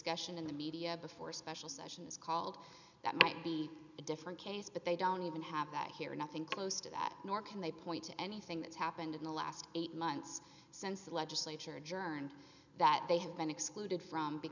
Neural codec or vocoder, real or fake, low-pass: none; real; 7.2 kHz